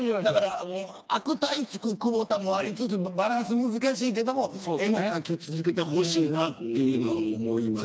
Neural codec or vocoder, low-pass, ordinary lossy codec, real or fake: codec, 16 kHz, 2 kbps, FreqCodec, smaller model; none; none; fake